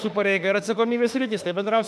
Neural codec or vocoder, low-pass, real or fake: autoencoder, 48 kHz, 32 numbers a frame, DAC-VAE, trained on Japanese speech; 14.4 kHz; fake